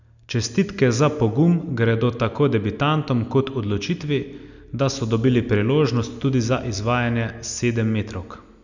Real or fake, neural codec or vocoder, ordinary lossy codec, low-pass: real; none; none; 7.2 kHz